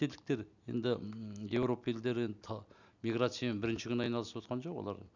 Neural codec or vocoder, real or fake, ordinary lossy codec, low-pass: none; real; none; 7.2 kHz